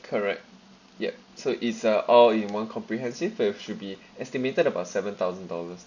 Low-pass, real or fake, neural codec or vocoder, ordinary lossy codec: 7.2 kHz; real; none; none